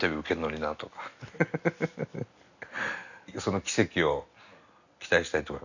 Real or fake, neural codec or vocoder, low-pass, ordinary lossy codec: real; none; 7.2 kHz; none